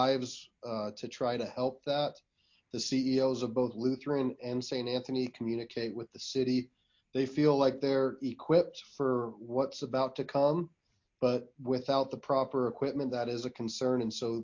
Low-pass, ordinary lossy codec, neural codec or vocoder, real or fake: 7.2 kHz; MP3, 48 kbps; none; real